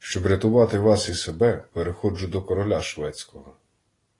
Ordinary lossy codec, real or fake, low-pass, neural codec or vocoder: AAC, 32 kbps; real; 10.8 kHz; none